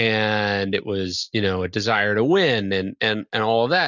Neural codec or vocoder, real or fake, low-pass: none; real; 7.2 kHz